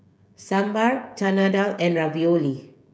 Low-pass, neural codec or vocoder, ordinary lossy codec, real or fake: none; codec, 16 kHz, 16 kbps, FreqCodec, smaller model; none; fake